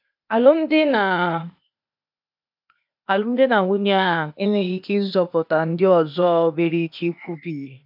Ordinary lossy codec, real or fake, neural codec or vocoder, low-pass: MP3, 48 kbps; fake; codec, 16 kHz, 0.8 kbps, ZipCodec; 5.4 kHz